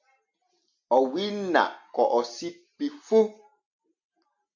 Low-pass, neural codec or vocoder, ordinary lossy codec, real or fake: 7.2 kHz; none; MP3, 48 kbps; real